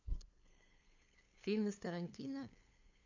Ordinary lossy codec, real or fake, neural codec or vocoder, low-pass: AAC, 48 kbps; fake; codec, 16 kHz, 2 kbps, FunCodec, trained on LibriTTS, 25 frames a second; 7.2 kHz